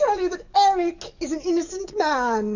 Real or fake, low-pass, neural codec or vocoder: fake; 7.2 kHz; codec, 44.1 kHz, 7.8 kbps, DAC